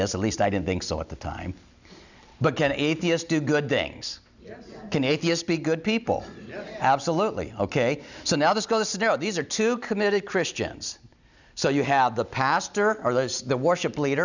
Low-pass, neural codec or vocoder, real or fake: 7.2 kHz; none; real